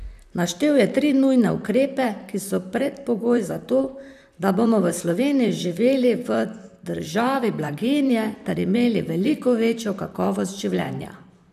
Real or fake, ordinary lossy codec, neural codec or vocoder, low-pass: fake; AAC, 96 kbps; vocoder, 44.1 kHz, 128 mel bands, Pupu-Vocoder; 14.4 kHz